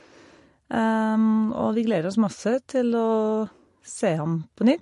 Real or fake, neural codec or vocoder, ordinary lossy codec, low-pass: real; none; MP3, 48 kbps; 14.4 kHz